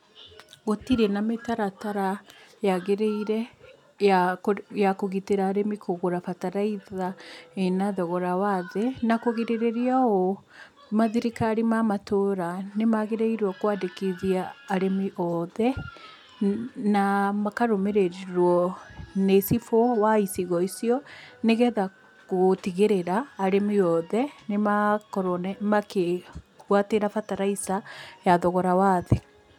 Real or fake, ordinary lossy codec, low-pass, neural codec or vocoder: real; none; 19.8 kHz; none